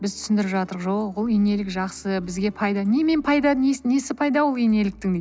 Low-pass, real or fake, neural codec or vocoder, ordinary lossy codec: none; real; none; none